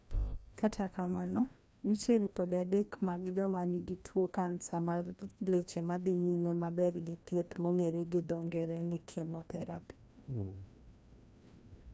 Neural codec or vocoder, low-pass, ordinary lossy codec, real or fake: codec, 16 kHz, 1 kbps, FreqCodec, larger model; none; none; fake